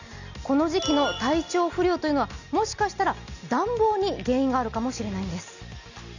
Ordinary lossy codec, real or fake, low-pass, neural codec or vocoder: none; real; 7.2 kHz; none